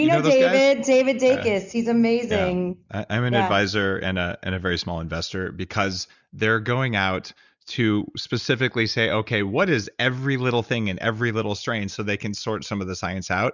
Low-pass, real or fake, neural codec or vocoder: 7.2 kHz; real; none